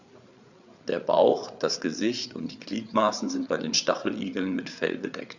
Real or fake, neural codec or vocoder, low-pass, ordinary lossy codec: fake; codec, 16 kHz, 8 kbps, FreqCodec, larger model; 7.2 kHz; Opus, 64 kbps